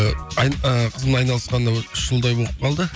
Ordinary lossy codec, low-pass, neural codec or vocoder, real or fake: none; none; none; real